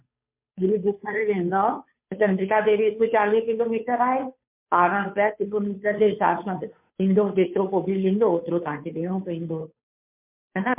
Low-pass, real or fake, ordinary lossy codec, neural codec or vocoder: 3.6 kHz; fake; MP3, 32 kbps; codec, 16 kHz, 2 kbps, FunCodec, trained on Chinese and English, 25 frames a second